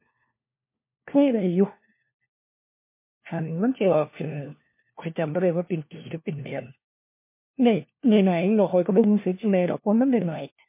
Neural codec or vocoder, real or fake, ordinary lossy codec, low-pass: codec, 16 kHz, 1 kbps, FunCodec, trained on LibriTTS, 50 frames a second; fake; MP3, 24 kbps; 3.6 kHz